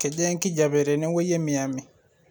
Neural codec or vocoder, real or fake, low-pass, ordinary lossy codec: none; real; none; none